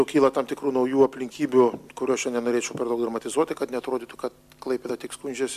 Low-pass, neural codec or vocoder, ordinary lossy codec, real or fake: 14.4 kHz; none; MP3, 96 kbps; real